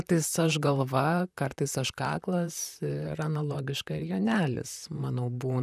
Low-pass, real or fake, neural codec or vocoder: 14.4 kHz; fake; vocoder, 44.1 kHz, 128 mel bands, Pupu-Vocoder